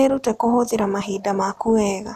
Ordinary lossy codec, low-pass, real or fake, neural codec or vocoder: none; 14.4 kHz; real; none